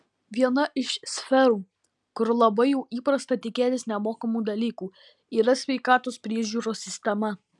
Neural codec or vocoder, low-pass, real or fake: none; 10.8 kHz; real